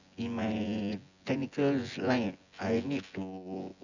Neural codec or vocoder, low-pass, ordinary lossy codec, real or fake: vocoder, 24 kHz, 100 mel bands, Vocos; 7.2 kHz; none; fake